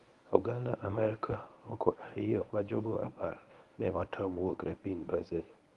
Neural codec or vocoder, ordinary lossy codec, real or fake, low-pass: codec, 24 kHz, 0.9 kbps, WavTokenizer, medium speech release version 1; Opus, 24 kbps; fake; 10.8 kHz